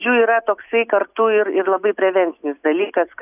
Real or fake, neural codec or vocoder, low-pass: real; none; 3.6 kHz